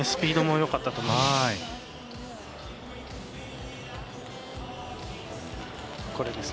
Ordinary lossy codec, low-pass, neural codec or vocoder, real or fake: none; none; none; real